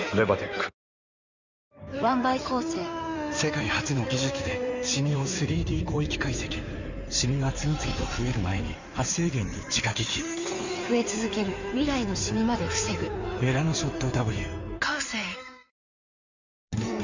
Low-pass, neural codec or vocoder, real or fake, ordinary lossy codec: 7.2 kHz; codec, 16 kHz in and 24 kHz out, 2.2 kbps, FireRedTTS-2 codec; fake; none